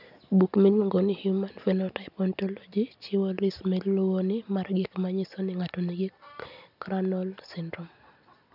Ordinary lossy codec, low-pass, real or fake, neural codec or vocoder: none; 5.4 kHz; real; none